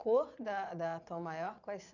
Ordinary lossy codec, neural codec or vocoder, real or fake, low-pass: none; vocoder, 22.05 kHz, 80 mel bands, Vocos; fake; 7.2 kHz